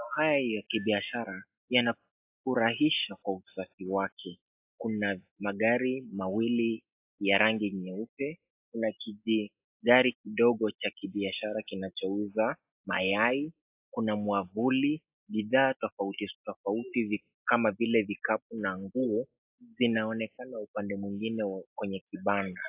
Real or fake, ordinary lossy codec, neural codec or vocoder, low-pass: real; AAC, 32 kbps; none; 3.6 kHz